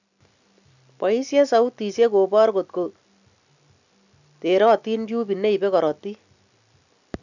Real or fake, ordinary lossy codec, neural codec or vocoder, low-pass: real; none; none; 7.2 kHz